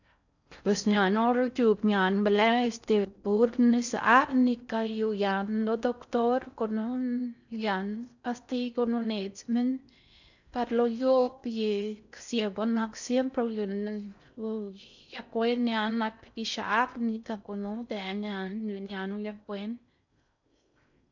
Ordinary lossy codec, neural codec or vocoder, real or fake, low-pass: none; codec, 16 kHz in and 24 kHz out, 0.6 kbps, FocalCodec, streaming, 4096 codes; fake; 7.2 kHz